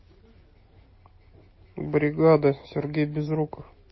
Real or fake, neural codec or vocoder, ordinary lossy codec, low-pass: real; none; MP3, 24 kbps; 7.2 kHz